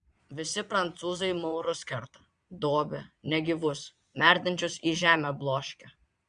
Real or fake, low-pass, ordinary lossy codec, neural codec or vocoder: fake; 9.9 kHz; Opus, 64 kbps; vocoder, 22.05 kHz, 80 mel bands, Vocos